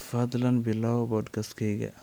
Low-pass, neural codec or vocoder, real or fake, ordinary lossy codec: none; none; real; none